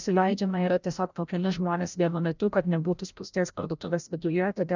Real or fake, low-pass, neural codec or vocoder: fake; 7.2 kHz; codec, 16 kHz, 0.5 kbps, FreqCodec, larger model